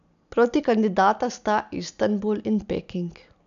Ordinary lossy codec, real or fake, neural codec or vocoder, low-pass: none; real; none; 7.2 kHz